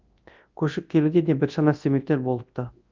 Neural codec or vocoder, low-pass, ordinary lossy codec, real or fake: codec, 24 kHz, 0.9 kbps, WavTokenizer, large speech release; 7.2 kHz; Opus, 24 kbps; fake